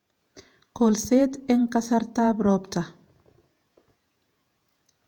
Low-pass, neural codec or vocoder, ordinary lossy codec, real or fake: 19.8 kHz; vocoder, 48 kHz, 128 mel bands, Vocos; none; fake